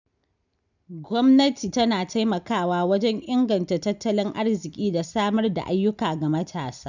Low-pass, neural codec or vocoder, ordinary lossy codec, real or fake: 7.2 kHz; none; none; real